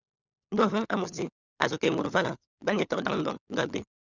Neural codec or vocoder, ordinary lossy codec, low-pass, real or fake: codec, 16 kHz, 16 kbps, FunCodec, trained on LibriTTS, 50 frames a second; Opus, 64 kbps; 7.2 kHz; fake